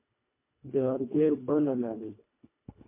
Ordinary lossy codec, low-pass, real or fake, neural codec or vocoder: MP3, 24 kbps; 3.6 kHz; fake; codec, 24 kHz, 1.5 kbps, HILCodec